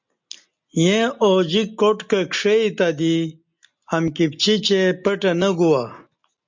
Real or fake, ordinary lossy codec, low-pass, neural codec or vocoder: real; MP3, 64 kbps; 7.2 kHz; none